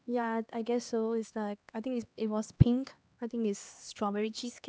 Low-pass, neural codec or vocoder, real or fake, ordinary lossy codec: none; codec, 16 kHz, 2 kbps, X-Codec, HuBERT features, trained on LibriSpeech; fake; none